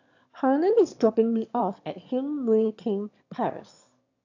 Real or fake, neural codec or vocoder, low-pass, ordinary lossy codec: fake; autoencoder, 22.05 kHz, a latent of 192 numbers a frame, VITS, trained on one speaker; 7.2 kHz; AAC, 48 kbps